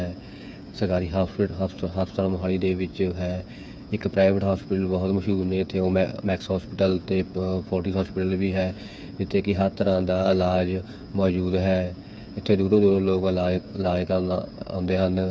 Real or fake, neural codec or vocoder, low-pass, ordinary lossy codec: fake; codec, 16 kHz, 8 kbps, FreqCodec, smaller model; none; none